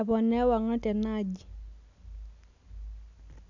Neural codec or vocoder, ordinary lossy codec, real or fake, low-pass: none; none; real; 7.2 kHz